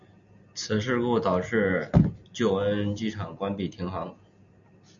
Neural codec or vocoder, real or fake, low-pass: none; real; 7.2 kHz